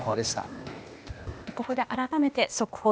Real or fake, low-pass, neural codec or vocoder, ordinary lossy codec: fake; none; codec, 16 kHz, 0.8 kbps, ZipCodec; none